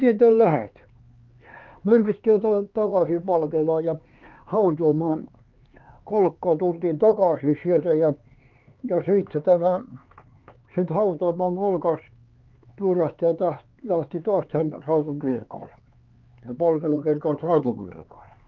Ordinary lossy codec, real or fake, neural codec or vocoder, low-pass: Opus, 24 kbps; fake; codec, 16 kHz, 4 kbps, X-Codec, HuBERT features, trained on LibriSpeech; 7.2 kHz